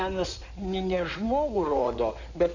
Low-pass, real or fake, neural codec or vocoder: 7.2 kHz; fake; codec, 16 kHz in and 24 kHz out, 2.2 kbps, FireRedTTS-2 codec